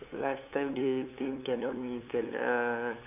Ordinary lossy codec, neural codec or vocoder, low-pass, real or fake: none; codec, 16 kHz, 8 kbps, FunCodec, trained on LibriTTS, 25 frames a second; 3.6 kHz; fake